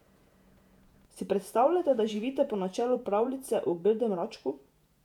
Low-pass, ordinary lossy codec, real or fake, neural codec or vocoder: 19.8 kHz; none; fake; vocoder, 48 kHz, 128 mel bands, Vocos